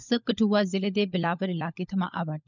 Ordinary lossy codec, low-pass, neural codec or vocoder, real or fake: none; 7.2 kHz; codec, 16 kHz, 16 kbps, FunCodec, trained on LibriTTS, 50 frames a second; fake